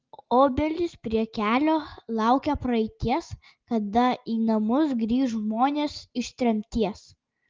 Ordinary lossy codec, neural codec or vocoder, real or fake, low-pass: Opus, 32 kbps; none; real; 7.2 kHz